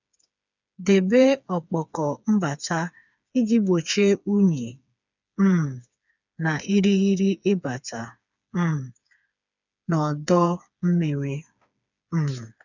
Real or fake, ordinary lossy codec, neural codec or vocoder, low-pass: fake; none; codec, 16 kHz, 4 kbps, FreqCodec, smaller model; 7.2 kHz